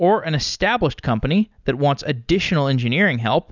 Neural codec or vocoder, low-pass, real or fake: none; 7.2 kHz; real